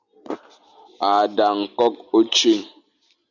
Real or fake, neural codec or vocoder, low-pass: real; none; 7.2 kHz